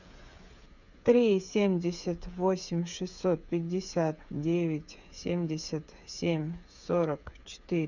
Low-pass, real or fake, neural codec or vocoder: 7.2 kHz; fake; codec, 16 kHz, 8 kbps, FreqCodec, smaller model